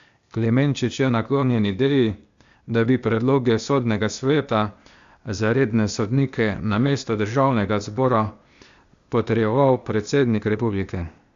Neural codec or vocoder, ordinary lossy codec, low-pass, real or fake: codec, 16 kHz, 0.8 kbps, ZipCodec; Opus, 64 kbps; 7.2 kHz; fake